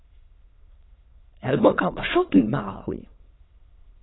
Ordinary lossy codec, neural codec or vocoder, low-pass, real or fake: AAC, 16 kbps; autoencoder, 22.05 kHz, a latent of 192 numbers a frame, VITS, trained on many speakers; 7.2 kHz; fake